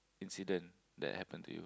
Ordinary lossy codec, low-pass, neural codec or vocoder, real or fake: none; none; none; real